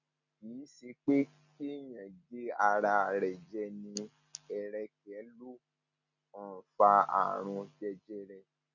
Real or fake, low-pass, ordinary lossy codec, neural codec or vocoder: real; 7.2 kHz; none; none